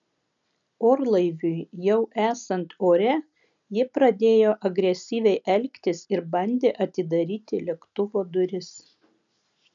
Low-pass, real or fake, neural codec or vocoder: 7.2 kHz; real; none